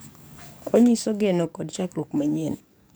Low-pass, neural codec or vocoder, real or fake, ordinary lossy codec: none; codec, 44.1 kHz, 7.8 kbps, DAC; fake; none